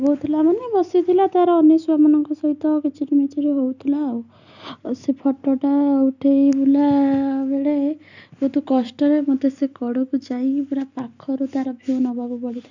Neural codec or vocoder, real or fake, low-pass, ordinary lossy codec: none; real; 7.2 kHz; none